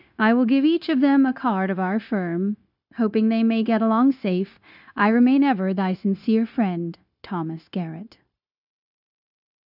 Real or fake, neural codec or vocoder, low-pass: fake; codec, 16 kHz, 0.9 kbps, LongCat-Audio-Codec; 5.4 kHz